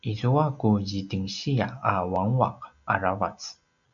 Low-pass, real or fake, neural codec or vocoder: 7.2 kHz; real; none